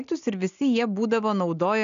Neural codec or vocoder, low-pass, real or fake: none; 7.2 kHz; real